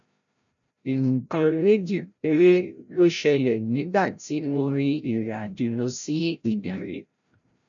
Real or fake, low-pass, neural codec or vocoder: fake; 7.2 kHz; codec, 16 kHz, 0.5 kbps, FreqCodec, larger model